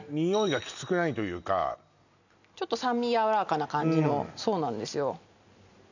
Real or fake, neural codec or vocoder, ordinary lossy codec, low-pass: real; none; none; 7.2 kHz